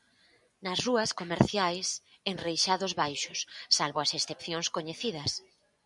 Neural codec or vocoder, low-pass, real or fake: vocoder, 24 kHz, 100 mel bands, Vocos; 10.8 kHz; fake